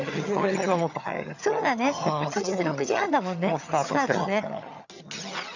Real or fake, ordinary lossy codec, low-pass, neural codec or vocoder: fake; none; 7.2 kHz; vocoder, 22.05 kHz, 80 mel bands, HiFi-GAN